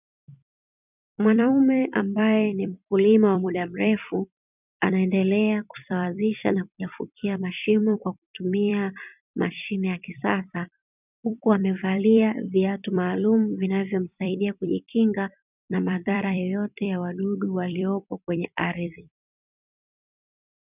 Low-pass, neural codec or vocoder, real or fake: 3.6 kHz; vocoder, 44.1 kHz, 128 mel bands every 256 samples, BigVGAN v2; fake